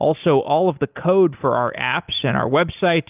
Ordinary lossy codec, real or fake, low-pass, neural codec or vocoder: Opus, 32 kbps; real; 3.6 kHz; none